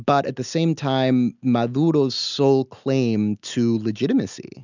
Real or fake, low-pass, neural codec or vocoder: real; 7.2 kHz; none